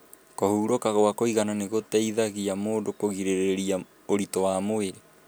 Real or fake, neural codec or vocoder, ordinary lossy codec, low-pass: real; none; none; none